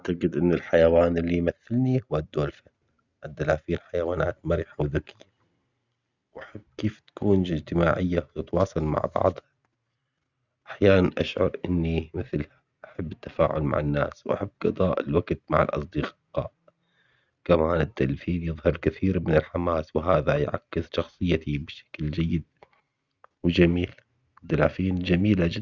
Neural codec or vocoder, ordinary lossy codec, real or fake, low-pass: none; Opus, 64 kbps; real; 7.2 kHz